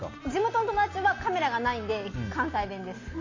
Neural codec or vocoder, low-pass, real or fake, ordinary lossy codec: none; 7.2 kHz; real; AAC, 32 kbps